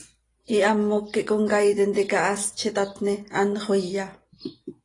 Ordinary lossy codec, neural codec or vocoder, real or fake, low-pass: AAC, 32 kbps; none; real; 10.8 kHz